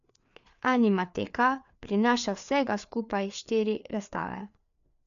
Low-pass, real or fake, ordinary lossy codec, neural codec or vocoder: 7.2 kHz; fake; none; codec, 16 kHz, 4 kbps, FreqCodec, larger model